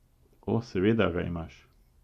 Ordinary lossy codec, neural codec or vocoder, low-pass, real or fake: Opus, 64 kbps; none; 14.4 kHz; real